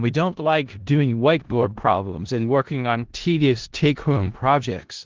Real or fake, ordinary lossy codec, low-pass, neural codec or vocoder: fake; Opus, 16 kbps; 7.2 kHz; codec, 16 kHz in and 24 kHz out, 0.4 kbps, LongCat-Audio-Codec, four codebook decoder